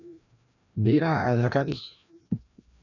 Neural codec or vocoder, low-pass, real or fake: codec, 16 kHz, 1 kbps, FreqCodec, larger model; 7.2 kHz; fake